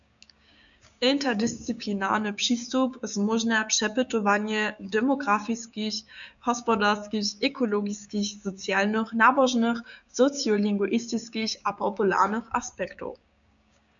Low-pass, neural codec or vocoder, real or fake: 7.2 kHz; codec, 16 kHz, 6 kbps, DAC; fake